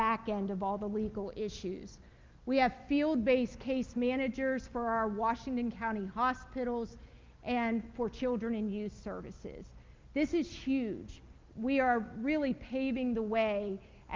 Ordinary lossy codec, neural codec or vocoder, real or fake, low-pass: Opus, 24 kbps; none; real; 7.2 kHz